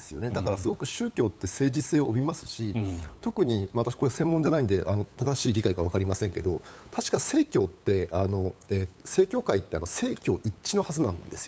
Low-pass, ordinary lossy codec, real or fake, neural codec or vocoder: none; none; fake; codec, 16 kHz, 8 kbps, FunCodec, trained on LibriTTS, 25 frames a second